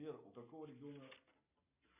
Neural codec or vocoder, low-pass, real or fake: none; 3.6 kHz; real